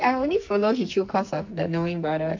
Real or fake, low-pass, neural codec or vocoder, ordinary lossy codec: fake; 7.2 kHz; codec, 44.1 kHz, 2.6 kbps, SNAC; MP3, 48 kbps